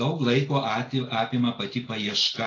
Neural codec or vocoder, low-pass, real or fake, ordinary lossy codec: none; 7.2 kHz; real; AAC, 32 kbps